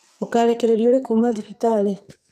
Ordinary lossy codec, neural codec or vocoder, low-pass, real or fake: none; codec, 44.1 kHz, 2.6 kbps, SNAC; 14.4 kHz; fake